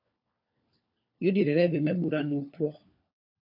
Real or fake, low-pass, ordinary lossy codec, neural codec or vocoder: fake; 5.4 kHz; AAC, 48 kbps; codec, 16 kHz, 4 kbps, FunCodec, trained on LibriTTS, 50 frames a second